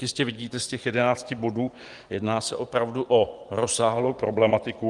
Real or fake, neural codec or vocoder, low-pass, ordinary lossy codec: fake; vocoder, 24 kHz, 100 mel bands, Vocos; 10.8 kHz; Opus, 32 kbps